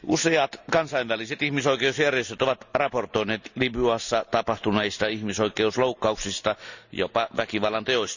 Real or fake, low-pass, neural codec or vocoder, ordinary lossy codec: real; 7.2 kHz; none; none